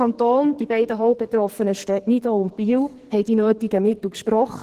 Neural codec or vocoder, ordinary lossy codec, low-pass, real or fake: codec, 44.1 kHz, 2.6 kbps, SNAC; Opus, 16 kbps; 14.4 kHz; fake